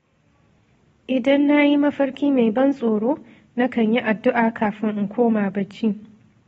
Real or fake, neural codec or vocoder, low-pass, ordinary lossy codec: real; none; 19.8 kHz; AAC, 24 kbps